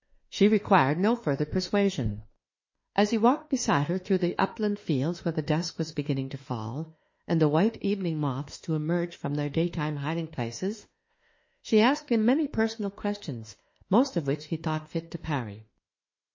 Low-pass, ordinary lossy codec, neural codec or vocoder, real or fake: 7.2 kHz; MP3, 32 kbps; autoencoder, 48 kHz, 32 numbers a frame, DAC-VAE, trained on Japanese speech; fake